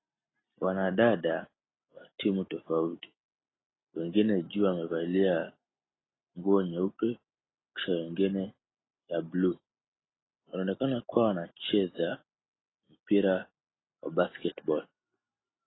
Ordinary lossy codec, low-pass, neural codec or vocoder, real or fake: AAC, 16 kbps; 7.2 kHz; none; real